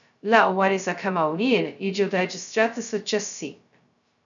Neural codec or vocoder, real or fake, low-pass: codec, 16 kHz, 0.2 kbps, FocalCodec; fake; 7.2 kHz